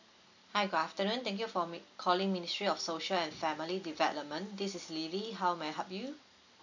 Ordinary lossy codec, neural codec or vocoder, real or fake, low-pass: none; none; real; 7.2 kHz